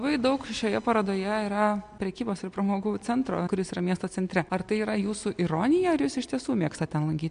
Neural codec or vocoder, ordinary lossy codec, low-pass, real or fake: none; MP3, 64 kbps; 9.9 kHz; real